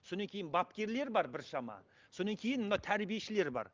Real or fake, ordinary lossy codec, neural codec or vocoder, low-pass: real; Opus, 24 kbps; none; 7.2 kHz